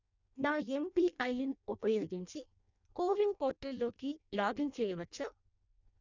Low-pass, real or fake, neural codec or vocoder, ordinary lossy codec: 7.2 kHz; fake; codec, 16 kHz in and 24 kHz out, 0.6 kbps, FireRedTTS-2 codec; none